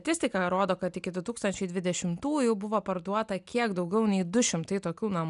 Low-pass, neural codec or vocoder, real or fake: 10.8 kHz; none; real